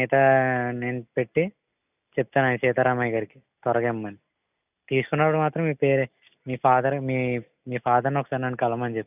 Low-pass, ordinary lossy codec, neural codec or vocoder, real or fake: 3.6 kHz; none; none; real